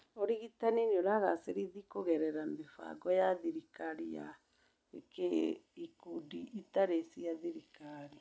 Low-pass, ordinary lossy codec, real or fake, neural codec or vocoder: none; none; real; none